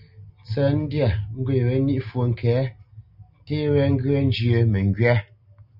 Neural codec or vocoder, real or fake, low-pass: none; real; 5.4 kHz